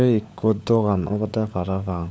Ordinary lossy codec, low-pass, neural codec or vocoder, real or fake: none; none; codec, 16 kHz, 16 kbps, FunCodec, trained on LibriTTS, 50 frames a second; fake